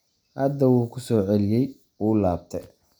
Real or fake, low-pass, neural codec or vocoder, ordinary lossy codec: real; none; none; none